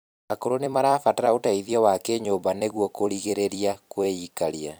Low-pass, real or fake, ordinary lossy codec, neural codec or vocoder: none; fake; none; vocoder, 44.1 kHz, 128 mel bands every 256 samples, BigVGAN v2